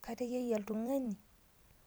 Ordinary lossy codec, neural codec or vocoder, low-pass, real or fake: none; vocoder, 44.1 kHz, 128 mel bands every 256 samples, BigVGAN v2; none; fake